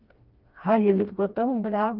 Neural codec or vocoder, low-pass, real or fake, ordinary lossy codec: codec, 16 kHz, 2 kbps, FreqCodec, smaller model; 5.4 kHz; fake; Opus, 32 kbps